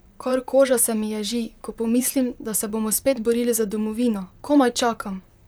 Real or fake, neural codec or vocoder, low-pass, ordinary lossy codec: fake; vocoder, 44.1 kHz, 128 mel bands, Pupu-Vocoder; none; none